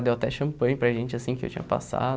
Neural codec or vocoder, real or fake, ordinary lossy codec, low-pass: none; real; none; none